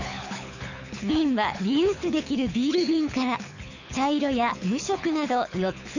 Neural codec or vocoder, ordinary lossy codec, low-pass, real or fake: codec, 24 kHz, 6 kbps, HILCodec; none; 7.2 kHz; fake